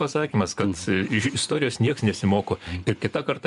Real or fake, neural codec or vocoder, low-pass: fake; vocoder, 24 kHz, 100 mel bands, Vocos; 10.8 kHz